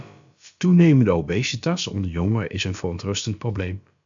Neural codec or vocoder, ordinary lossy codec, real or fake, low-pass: codec, 16 kHz, about 1 kbps, DyCAST, with the encoder's durations; MP3, 64 kbps; fake; 7.2 kHz